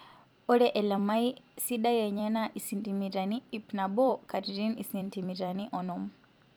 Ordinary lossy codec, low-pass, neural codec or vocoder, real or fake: none; none; vocoder, 44.1 kHz, 128 mel bands every 256 samples, BigVGAN v2; fake